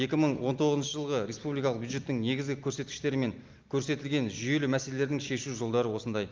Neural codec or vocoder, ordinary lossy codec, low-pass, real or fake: none; Opus, 24 kbps; 7.2 kHz; real